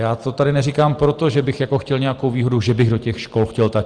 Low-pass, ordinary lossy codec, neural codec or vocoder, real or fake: 9.9 kHz; Opus, 24 kbps; none; real